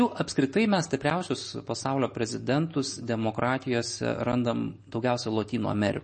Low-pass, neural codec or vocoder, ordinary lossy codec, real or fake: 10.8 kHz; none; MP3, 32 kbps; real